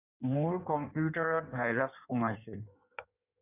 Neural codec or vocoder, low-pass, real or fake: codec, 16 kHz in and 24 kHz out, 1.1 kbps, FireRedTTS-2 codec; 3.6 kHz; fake